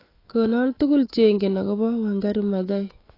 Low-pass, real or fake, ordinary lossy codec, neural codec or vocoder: 5.4 kHz; fake; AAC, 24 kbps; autoencoder, 48 kHz, 128 numbers a frame, DAC-VAE, trained on Japanese speech